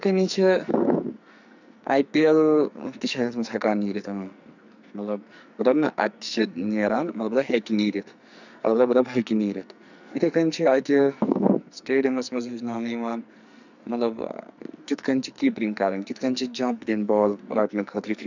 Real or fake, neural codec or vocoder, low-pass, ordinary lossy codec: fake; codec, 44.1 kHz, 2.6 kbps, SNAC; 7.2 kHz; none